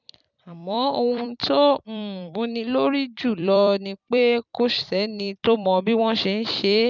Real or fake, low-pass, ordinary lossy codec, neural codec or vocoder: fake; 7.2 kHz; none; vocoder, 44.1 kHz, 128 mel bands every 256 samples, BigVGAN v2